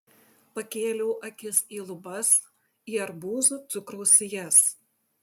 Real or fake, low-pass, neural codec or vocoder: real; 19.8 kHz; none